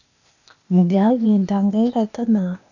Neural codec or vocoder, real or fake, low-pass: codec, 16 kHz, 0.8 kbps, ZipCodec; fake; 7.2 kHz